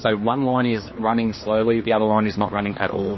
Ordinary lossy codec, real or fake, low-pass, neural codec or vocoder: MP3, 24 kbps; fake; 7.2 kHz; codec, 16 kHz, 2 kbps, X-Codec, HuBERT features, trained on general audio